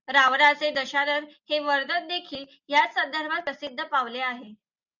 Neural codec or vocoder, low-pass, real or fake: none; 7.2 kHz; real